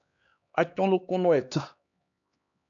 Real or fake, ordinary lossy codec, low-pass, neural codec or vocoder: fake; AAC, 64 kbps; 7.2 kHz; codec, 16 kHz, 2 kbps, X-Codec, HuBERT features, trained on LibriSpeech